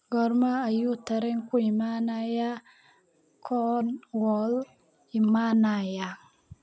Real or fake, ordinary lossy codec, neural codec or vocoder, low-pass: real; none; none; none